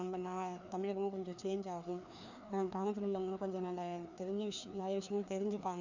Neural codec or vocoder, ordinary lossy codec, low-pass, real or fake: codec, 16 kHz, 2 kbps, FreqCodec, larger model; none; 7.2 kHz; fake